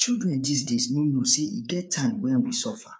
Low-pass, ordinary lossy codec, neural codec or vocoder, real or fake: none; none; codec, 16 kHz, 4 kbps, FreqCodec, larger model; fake